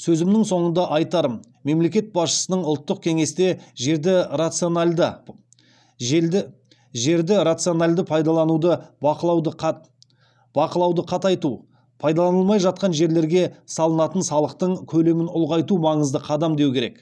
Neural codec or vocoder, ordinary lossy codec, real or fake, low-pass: none; none; real; none